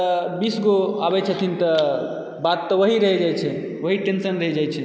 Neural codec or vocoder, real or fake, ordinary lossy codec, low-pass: none; real; none; none